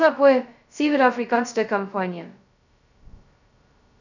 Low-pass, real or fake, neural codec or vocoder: 7.2 kHz; fake; codec, 16 kHz, 0.2 kbps, FocalCodec